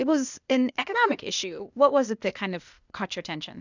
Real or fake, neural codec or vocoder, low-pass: fake; codec, 16 kHz, 0.8 kbps, ZipCodec; 7.2 kHz